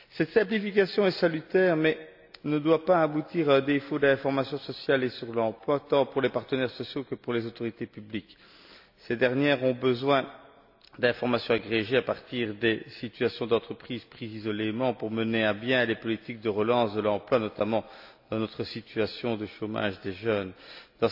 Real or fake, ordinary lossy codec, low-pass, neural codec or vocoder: real; AAC, 48 kbps; 5.4 kHz; none